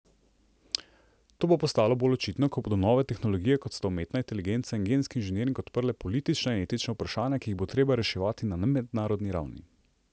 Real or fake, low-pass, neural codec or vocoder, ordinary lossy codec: real; none; none; none